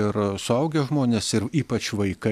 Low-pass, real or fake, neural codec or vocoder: 14.4 kHz; real; none